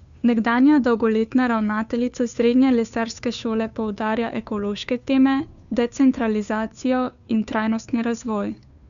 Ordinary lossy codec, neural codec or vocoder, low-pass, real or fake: none; codec, 16 kHz, 2 kbps, FunCodec, trained on Chinese and English, 25 frames a second; 7.2 kHz; fake